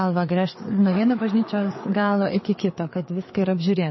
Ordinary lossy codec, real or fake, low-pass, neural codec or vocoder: MP3, 24 kbps; fake; 7.2 kHz; codec, 16 kHz, 4 kbps, FreqCodec, larger model